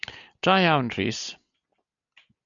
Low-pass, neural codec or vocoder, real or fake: 7.2 kHz; none; real